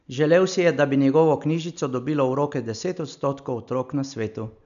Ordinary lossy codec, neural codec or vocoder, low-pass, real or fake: none; none; 7.2 kHz; real